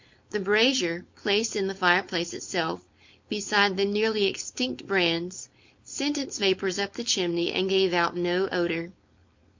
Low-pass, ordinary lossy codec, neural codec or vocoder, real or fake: 7.2 kHz; MP3, 48 kbps; codec, 16 kHz, 4.8 kbps, FACodec; fake